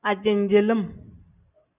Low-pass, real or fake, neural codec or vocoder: 3.6 kHz; real; none